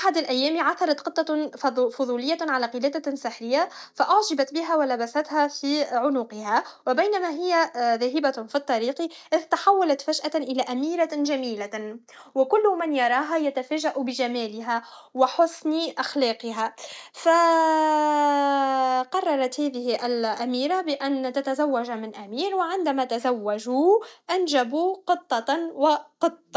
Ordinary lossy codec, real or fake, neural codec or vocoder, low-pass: none; real; none; none